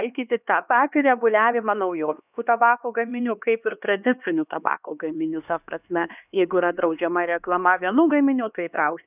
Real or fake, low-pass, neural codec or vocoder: fake; 3.6 kHz; codec, 16 kHz, 2 kbps, X-Codec, HuBERT features, trained on LibriSpeech